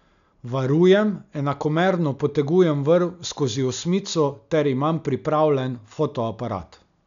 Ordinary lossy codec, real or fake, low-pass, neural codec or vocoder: none; real; 7.2 kHz; none